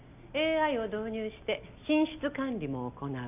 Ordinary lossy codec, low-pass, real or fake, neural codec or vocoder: none; 3.6 kHz; real; none